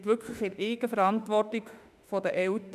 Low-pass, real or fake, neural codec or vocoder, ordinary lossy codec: 14.4 kHz; fake; autoencoder, 48 kHz, 32 numbers a frame, DAC-VAE, trained on Japanese speech; none